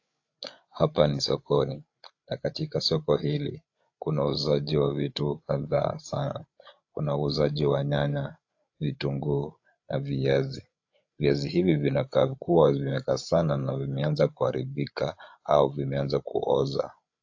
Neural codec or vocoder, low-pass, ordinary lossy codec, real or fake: codec, 16 kHz, 8 kbps, FreqCodec, larger model; 7.2 kHz; AAC, 48 kbps; fake